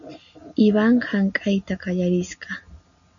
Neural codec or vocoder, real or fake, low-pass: none; real; 7.2 kHz